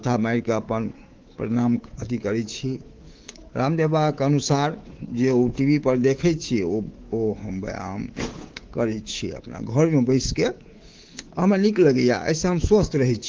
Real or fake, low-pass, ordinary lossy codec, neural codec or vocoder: fake; 7.2 kHz; Opus, 16 kbps; codec, 24 kHz, 3.1 kbps, DualCodec